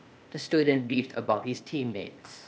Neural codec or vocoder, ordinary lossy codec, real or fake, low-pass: codec, 16 kHz, 0.8 kbps, ZipCodec; none; fake; none